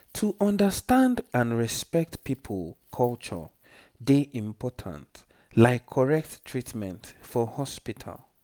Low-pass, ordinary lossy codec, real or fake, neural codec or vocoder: none; none; real; none